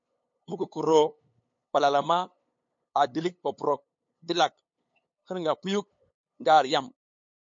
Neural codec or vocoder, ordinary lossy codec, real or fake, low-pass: codec, 16 kHz, 8 kbps, FunCodec, trained on LibriTTS, 25 frames a second; MP3, 48 kbps; fake; 7.2 kHz